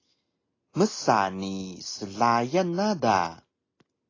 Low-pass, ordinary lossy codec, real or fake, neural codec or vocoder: 7.2 kHz; AAC, 32 kbps; real; none